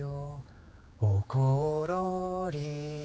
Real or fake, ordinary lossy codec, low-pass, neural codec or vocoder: fake; none; none; codec, 16 kHz, 4 kbps, X-Codec, HuBERT features, trained on general audio